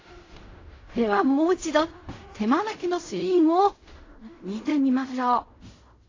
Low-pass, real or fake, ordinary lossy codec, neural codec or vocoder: 7.2 kHz; fake; AAC, 32 kbps; codec, 16 kHz in and 24 kHz out, 0.4 kbps, LongCat-Audio-Codec, fine tuned four codebook decoder